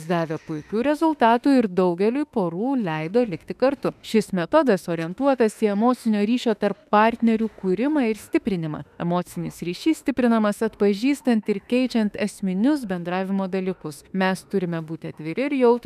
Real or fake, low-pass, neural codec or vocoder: fake; 14.4 kHz; autoencoder, 48 kHz, 32 numbers a frame, DAC-VAE, trained on Japanese speech